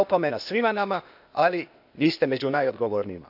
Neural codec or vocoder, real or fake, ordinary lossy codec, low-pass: codec, 16 kHz, 0.8 kbps, ZipCodec; fake; none; 5.4 kHz